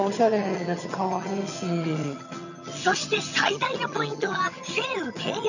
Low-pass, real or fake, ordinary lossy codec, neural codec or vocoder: 7.2 kHz; fake; none; vocoder, 22.05 kHz, 80 mel bands, HiFi-GAN